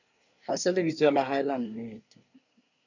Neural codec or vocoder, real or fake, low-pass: codec, 24 kHz, 1 kbps, SNAC; fake; 7.2 kHz